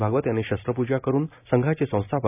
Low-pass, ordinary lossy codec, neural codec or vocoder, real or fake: 3.6 kHz; none; none; real